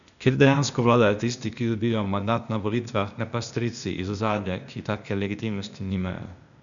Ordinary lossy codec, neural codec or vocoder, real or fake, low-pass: none; codec, 16 kHz, 0.8 kbps, ZipCodec; fake; 7.2 kHz